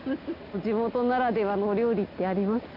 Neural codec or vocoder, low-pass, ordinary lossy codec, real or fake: none; 5.4 kHz; none; real